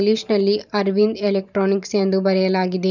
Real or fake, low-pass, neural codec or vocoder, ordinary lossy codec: fake; 7.2 kHz; vocoder, 44.1 kHz, 128 mel bands every 512 samples, BigVGAN v2; none